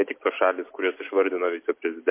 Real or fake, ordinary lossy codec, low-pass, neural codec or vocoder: real; MP3, 24 kbps; 3.6 kHz; none